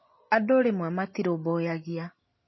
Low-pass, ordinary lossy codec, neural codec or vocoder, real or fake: 7.2 kHz; MP3, 24 kbps; none; real